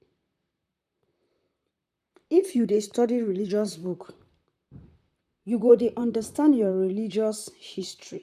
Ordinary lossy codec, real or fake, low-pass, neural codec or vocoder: none; fake; 14.4 kHz; vocoder, 44.1 kHz, 128 mel bands, Pupu-Vocoder